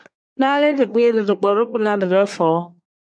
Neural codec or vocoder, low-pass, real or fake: codec, 24 kHz, 1 kbps, SNAC; 9.9 kHz; fake